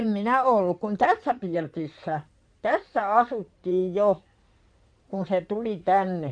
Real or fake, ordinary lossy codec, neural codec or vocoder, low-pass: fake; none; codec, 16 kHz in and 24 kHz out, 2.2 kbps, FireRedTTS-2 codec; 9.9 kHz